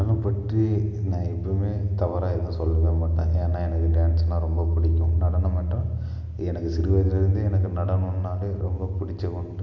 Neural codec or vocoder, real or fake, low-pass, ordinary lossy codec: none; real; 7.2 kHz; AAC, 48 kbps